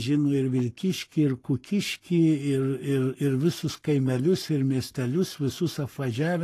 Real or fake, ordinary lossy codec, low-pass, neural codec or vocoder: fake; AAC, 48 kbps; 14.4 kHz; codec, 44.1 kHz, 7.8 kbps, Pupu-Codec